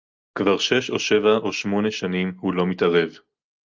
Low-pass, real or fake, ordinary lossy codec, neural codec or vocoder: 7.2 kHz; real; Opus, 24 kbps; none